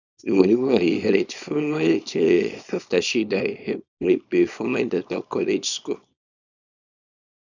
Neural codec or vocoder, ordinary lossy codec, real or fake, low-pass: codec, 24 kHz, 0.9 kbps, WavTokenizer, small release; none; fake; 7.2 kHz